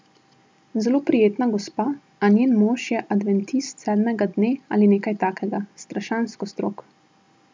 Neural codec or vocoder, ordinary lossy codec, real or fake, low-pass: none; none; real; none